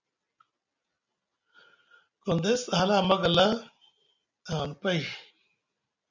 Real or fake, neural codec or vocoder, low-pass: real; none; 7.2 kHz